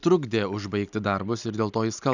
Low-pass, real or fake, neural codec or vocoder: 7.2 kHz; real; none